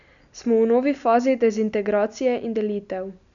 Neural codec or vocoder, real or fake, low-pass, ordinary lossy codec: none; real; 7.2 kHz; none